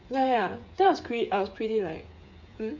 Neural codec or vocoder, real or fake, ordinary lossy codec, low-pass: codec, 16 kHz, 8 kbps, FreqCodec, smaller model; fake; MP3, 48 kbps; 7.2 kHz